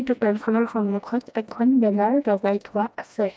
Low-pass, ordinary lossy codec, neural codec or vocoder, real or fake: none; none; codec, 16 kHz, 1 kbps, FreqCodec, smaller model; fake